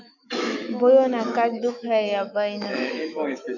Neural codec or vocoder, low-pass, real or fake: autoencoder, 48 kHz, 128 numbers a frame, DAC-VAE, trained on Japanese speech; 7.2 kHz; fake